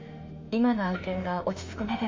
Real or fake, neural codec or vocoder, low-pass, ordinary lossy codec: fake; autoencoder, 48 kHz, 32 numbers a frame, DAC-VAE, trained on Japanese speech; 7.2 kHz; MP3, 48 kbps